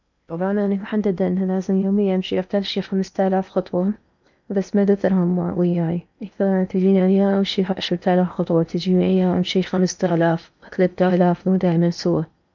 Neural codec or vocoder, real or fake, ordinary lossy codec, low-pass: codec, 16 kHz in and 24 kHz out, 0.8 kbps, FocalCodec, streaming, 65536 codes; fake; none; 7.2 kHz